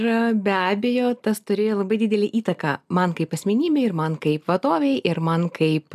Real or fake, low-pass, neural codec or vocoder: real; 14.4 kHz; none